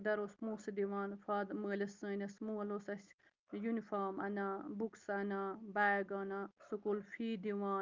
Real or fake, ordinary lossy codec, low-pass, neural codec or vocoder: real; Opus, 24 kbps; 7.2 kHz; none